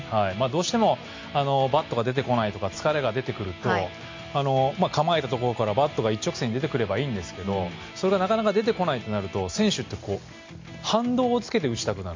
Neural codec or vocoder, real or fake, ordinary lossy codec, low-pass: none; real; MP3, 48 kbps; 7.2 kHz